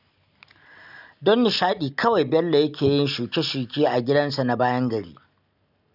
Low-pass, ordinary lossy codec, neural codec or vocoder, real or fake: 5.4 kHz; none; none; real